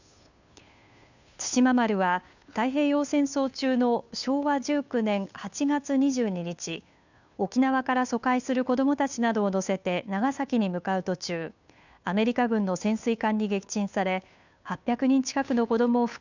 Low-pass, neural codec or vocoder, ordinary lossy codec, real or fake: 7.2 kHz; codec, 16 kHz, 2 kbps, FunCodec, trained on Chinese and English, 25 frames a second; none; fake